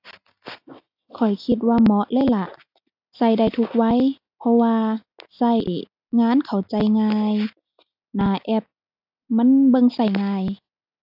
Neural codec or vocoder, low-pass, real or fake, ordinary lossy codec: none; 5.4 kHz; real; AAC, 48 kbps